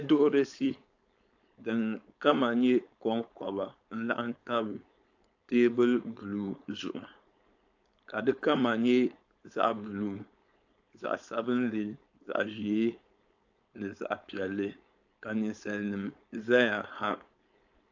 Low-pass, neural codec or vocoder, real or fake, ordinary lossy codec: 7.2 kHz; codec, 16 kHz, 4.8 kbps, FACodec; fake; AAC, 48 kbps